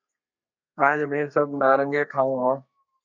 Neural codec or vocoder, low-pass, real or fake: codec, 32 kHz, 1.9 kbps, SNAC; 7.2 kHz; fake